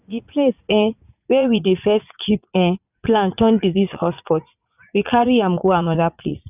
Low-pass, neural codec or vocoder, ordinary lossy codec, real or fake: 3.6 kHz; vocoder, 44.1 kHz, 80 mel bands, Vocos; none; fake